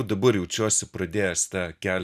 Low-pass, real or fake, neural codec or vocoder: 14.4 kHz; real; none